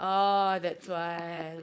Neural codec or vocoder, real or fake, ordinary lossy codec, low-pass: codec, 16 kHz, 4.8 kbps, FACodec; fake; none; none